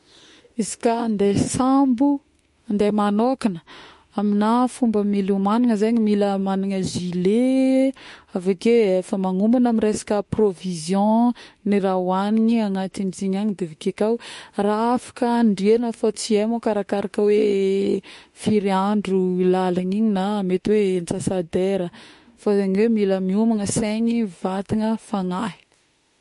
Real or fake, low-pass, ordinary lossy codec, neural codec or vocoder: fake; 14.4 kHz; MP3, 48 kbps; autoencoder, 48 kHz, 32 numbers a frame, DAC-VAE, trained on Japanese speech